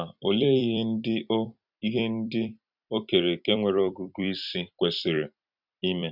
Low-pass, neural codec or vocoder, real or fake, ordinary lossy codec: 5.4 kHz; vocoder, 44.1 kHz, 128 mel bands every 256 samples, BigVGAN v2; fake; none